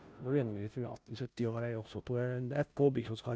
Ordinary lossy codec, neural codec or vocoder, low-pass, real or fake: none; codec, 16 kHz, 0.5 kbps, FunCodec, trained on Chinese and English, 25 frames a second; none; fake